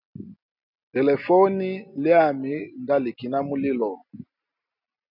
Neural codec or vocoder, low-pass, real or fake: none; 5.4 kHz; real